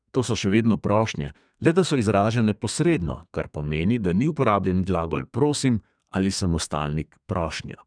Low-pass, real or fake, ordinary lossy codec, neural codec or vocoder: 9.9 kHz; fake; none; codec, 32 kHz, 1.9 kbps, SNAC